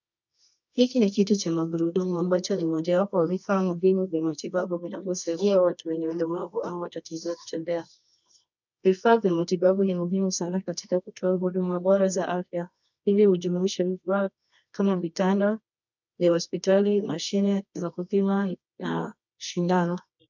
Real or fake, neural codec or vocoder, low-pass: fake; codec, 24 kHz, 0.9 kbps, WavTokenizer, medium music audio release; 7.2 kHz